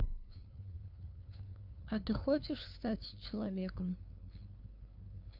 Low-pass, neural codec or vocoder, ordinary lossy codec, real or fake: 5.4 kHz; codec, 16 kHz, 2 kbps, FunCodec, trained on LibriTTS, 25 frames a second; none; fake